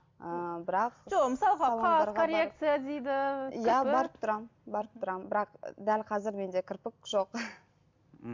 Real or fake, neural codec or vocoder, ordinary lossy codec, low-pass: real; none; MP3, 64 kbps; 7.2 kHz